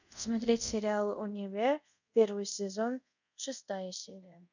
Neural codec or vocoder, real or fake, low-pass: codec, 24 kHz, 0.5 kbps, DualCodec; fake; 7.2 kHz